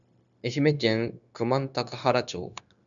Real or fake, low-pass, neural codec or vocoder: fake; 7.2 kHz; codec, 16 kHz, 0.9 kbps, LongCat-Audio-Codec